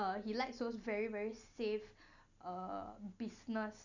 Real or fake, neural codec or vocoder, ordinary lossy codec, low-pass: real; none; none; 7.2 kHz